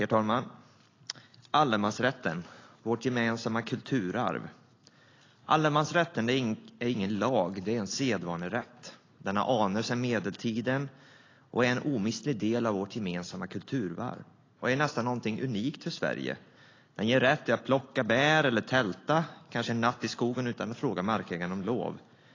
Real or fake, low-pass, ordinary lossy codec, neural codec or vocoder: real; 7.2 kHz; AAC, 32 kbps; none